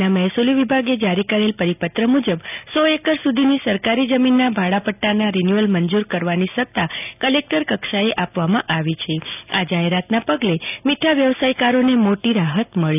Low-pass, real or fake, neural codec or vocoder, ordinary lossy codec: 3.6 kHz; real; none; none